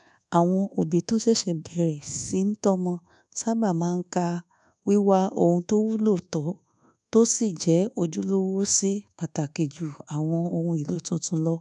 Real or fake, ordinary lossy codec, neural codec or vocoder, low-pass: fake; none; codec, 24 kHz, 1.2 kbps, DualCodec; 10.8 kHz